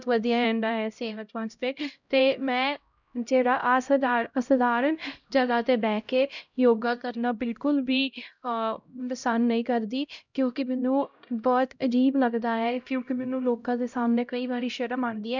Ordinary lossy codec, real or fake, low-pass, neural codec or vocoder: none; fake; 7.2 kHz; codec, 16 kHz, 0.5 kbps, X-Codec, HuBERT features, trained on LibriSpeech